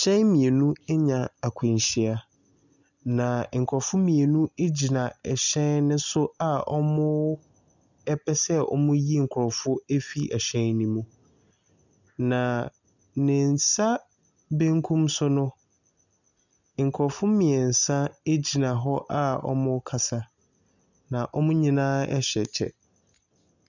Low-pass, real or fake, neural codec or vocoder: 7.2 kHz; real; none